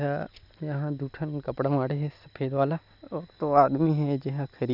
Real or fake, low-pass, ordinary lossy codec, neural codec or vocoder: real; 5.4 kHz; none; none